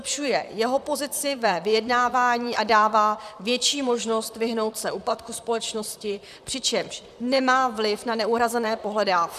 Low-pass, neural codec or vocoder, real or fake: 14.4 kHz; vocoder, 44.1 kHz, 128 mel bands, Pupu-Vocoder; fake